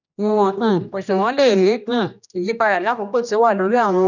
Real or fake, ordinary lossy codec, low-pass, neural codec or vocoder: fake; none; 7.2 kHz; codec, 16 kHz, 1 kbps, X-Codec, HuBERT features, trained on general audio